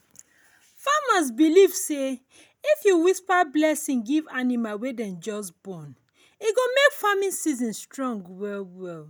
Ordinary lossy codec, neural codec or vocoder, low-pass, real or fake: none; none; none; real